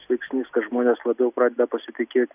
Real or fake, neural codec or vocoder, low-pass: real; none; 3.6 kHz